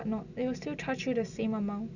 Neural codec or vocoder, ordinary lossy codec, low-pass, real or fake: none; none; 7.2 kHz; real